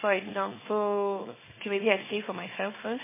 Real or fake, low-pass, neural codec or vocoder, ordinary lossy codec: fake; 3.6 kHz; codec, 24 kHz, 0.9 kbps, WavTokenizer, small release; MP3, 16 kbps